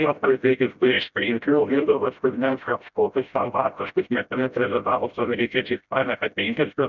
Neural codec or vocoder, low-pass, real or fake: codec, 16 kHz, 0.5 kbps, FreqCodec, smaller model; 7.2 kHz; fake